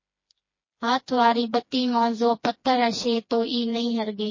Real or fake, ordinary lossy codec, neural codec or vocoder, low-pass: fake; MP3, 32 kbps; codec, 16 kHz, 2 kbps, FreqCodec, smaller model; 7.2 kHz